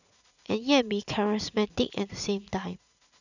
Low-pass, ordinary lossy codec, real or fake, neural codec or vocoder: 7.2 kHz; none; fake; vocoder, 44.1 kHz, 80 mel bands, Vocos